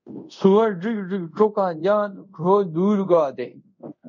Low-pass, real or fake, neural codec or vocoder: 7.2 kHz; fake; codec, 24 kHz, 0.5 kbps, DualCodec